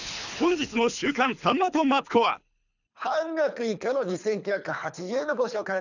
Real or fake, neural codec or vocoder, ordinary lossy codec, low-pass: fake; codec, 24 kHz, 3 kbps, HILCodec; none; 7.2 kHz